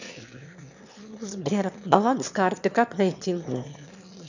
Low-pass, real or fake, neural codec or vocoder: 7.2 kHz; fake; autoencoder, 22.05 kHz, a latent of 192 numbers a frame, VITS, trained on one speaker